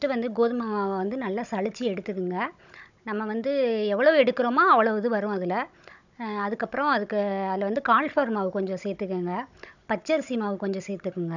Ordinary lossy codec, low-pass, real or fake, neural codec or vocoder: none; 7.2 kHz; fake; codec, 16 kHz, 16 kbps, FunCodec, trained on Chinese and English, 50 frames a second